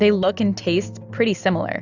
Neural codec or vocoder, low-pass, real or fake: none; 7.2 kHz; real